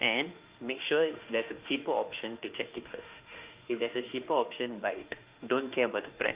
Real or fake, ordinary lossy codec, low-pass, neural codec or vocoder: fake; Opus, 32 kbps; 3.6 kHz; autoencoder, 48 kHz, 32 numbers a frame, DAC-VAE, trained on Japanese speech